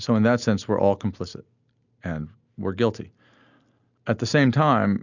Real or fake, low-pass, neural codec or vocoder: real; 7.2 kHz; none